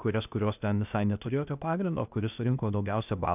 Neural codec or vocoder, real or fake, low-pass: codec, 16 kHz, 0.8 kbps, ZipCodec; fake; 3.6 kHz